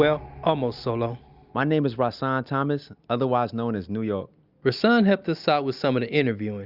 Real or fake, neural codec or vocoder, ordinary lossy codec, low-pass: real; none; Opus, 64 kbps; 5.4 kHz